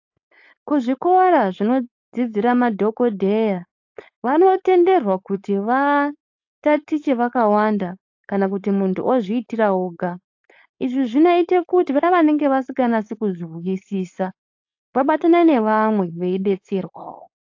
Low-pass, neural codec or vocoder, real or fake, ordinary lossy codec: 7.2 kHz; codec, 16 kHz, 4.8 kbps, FACodec; fake; AAC, 48 kbps